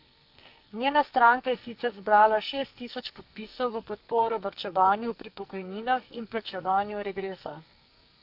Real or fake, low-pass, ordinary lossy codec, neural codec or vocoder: fake; 5.4 kHz; AAC, 48 kbps; codec, 44.1 kHz, 2.6 kbps, SNAC